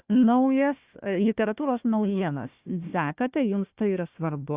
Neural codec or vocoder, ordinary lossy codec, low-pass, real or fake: codec, 16 kHz, 1 kbps, FunCodec, trained on Chinese and English, 50 frames a second; Opus, 64 kbps; 3.6 kHz; fake